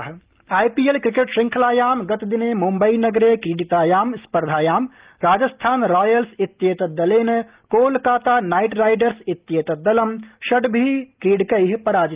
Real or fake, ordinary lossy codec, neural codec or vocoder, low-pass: real; Opus, 32 kbps; none; 3.6 kHz